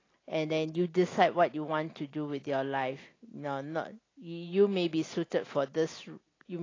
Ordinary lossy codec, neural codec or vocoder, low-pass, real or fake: AAC, 32 kbps; none; 7.2 kHz; real